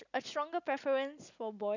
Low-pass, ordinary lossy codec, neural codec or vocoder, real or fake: 7.2 kHz; none; none; real